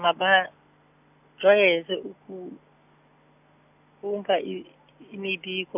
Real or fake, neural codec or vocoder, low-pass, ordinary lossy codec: real; none; 3.6 kHz; none